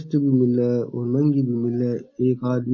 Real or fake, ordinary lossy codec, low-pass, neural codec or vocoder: real; MP3, 32 kbps; 7.2 kHz; none